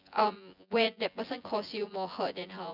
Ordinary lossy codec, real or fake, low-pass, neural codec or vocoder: none; fake; 5.4 kHz; vocoder, 24 kHz, 100 mel bands, Vocos